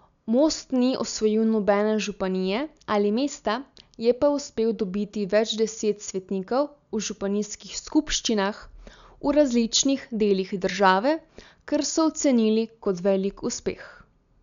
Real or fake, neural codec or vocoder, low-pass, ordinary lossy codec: real; none; 7.2 kHz; none